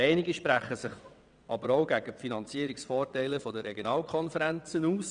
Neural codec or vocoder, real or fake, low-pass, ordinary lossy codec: vocoder, 22.05 kHz, 80 mel bands, WaveNeXt; fake; 9.9 kHz; none